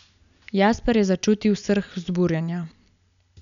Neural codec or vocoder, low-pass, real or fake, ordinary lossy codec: none; 7.2 kHz; real; none